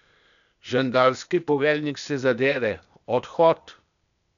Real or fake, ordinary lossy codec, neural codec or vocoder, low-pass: fake; none; codec, 16 kHz, 0.8 kbps, ZipCodec; 7.2 kHz